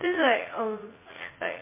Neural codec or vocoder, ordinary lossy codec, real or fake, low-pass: vocoder, 44.1 kHz, 80 mel bands, Vocos; MP3, 16 kbps; fake; 3.6 kHz